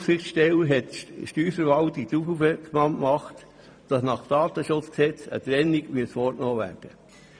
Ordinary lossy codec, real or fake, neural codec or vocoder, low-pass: none; fake; vocoder, 44.1 kHz, 128 mel bands every 512 samples, BigVGAN v2; 9.9 kHz